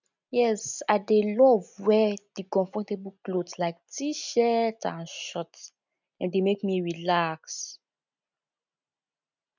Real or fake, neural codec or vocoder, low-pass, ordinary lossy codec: real; none; 7.2 kHz; none